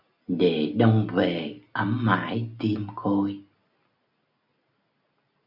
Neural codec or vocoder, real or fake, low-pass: none; real; 5.4 kHz